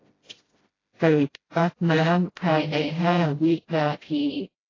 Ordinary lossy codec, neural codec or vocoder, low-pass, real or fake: AAC, 32 kbps; codec, 16 kHz, 0.5 kbps, FreqCodec, smaller model; 7.2 kHz; fake